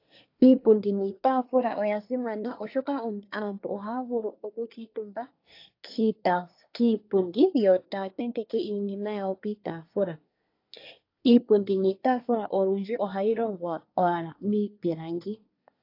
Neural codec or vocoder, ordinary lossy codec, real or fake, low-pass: codec, 24 kHz, 1 kbps, SNAC; AAC, 32 kbps; fake; 5.4 kHz